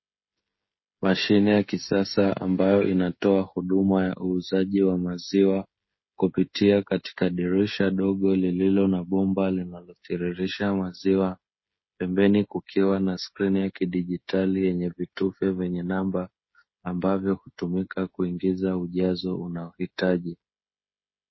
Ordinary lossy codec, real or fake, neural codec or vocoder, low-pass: MP3, 24 kbps; fake; codec, 16 kHz, 16 kbps, FreqCodec, smaller model; 7.2 kHz